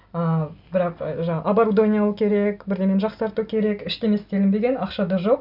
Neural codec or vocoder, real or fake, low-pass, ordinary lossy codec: none; real; 5.4 kHz; none